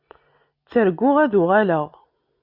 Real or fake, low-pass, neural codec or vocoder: real; 5.4 kHz; none